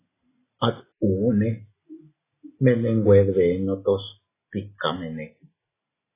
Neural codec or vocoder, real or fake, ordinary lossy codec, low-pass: none; real; AAC, 16 kbps; 3.6 kHz